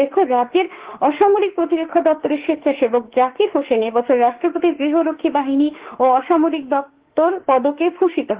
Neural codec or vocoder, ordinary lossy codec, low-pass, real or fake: autoencoder, 48 kHz, 32 numbers a frame, DAC-VAE, trained on Japanese speech; Opus, 16 kbps; 3.6 kHz; fake